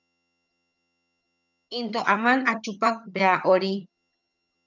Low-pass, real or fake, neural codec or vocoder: 7.2 kHz; fake; vocoder, 22.05 kHz, 80 mel bands, HiFi-GAN